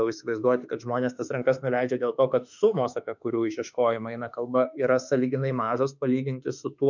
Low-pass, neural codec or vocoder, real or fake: 7.2 kHz; autoencoder, 48 kHz, 32 numbers a frame, DAC-VAE, trained on Japanese speech; fake